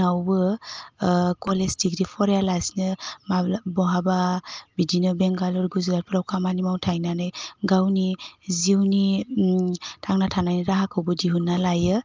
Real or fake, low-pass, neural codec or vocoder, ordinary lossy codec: real; 7.2 kHz; none; Opus, 24 kbps